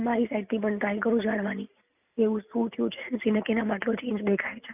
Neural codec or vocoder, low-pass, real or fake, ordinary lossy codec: none; 3.6 kHz; real; none